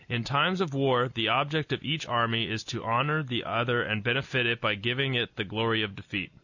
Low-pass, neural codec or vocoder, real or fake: 7.2 kHz; none; real